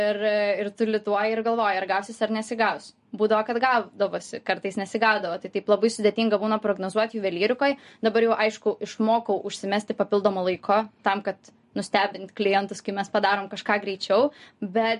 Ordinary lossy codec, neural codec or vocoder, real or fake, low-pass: MP3, 48 kbps; none; real; 10.8 kHz